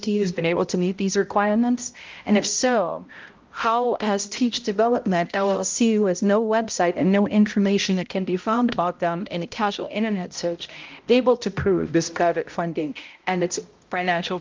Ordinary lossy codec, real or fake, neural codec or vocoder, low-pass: Opus, 24 kbps; fake; codec, 16 kHz, 0.5 kbps, X-Codec, HuBERT features, trained on balanced general audio; 7.2 kHz